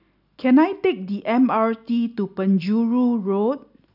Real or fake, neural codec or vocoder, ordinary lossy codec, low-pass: real; none; none; 5.4 kHz